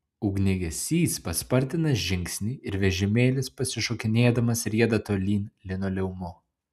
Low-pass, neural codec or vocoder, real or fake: 14.4 kHz; none; real